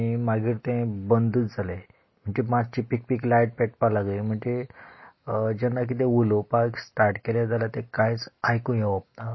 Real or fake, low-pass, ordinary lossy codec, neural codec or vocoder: real; 7.2 kHz; MP3, 24 kbps; none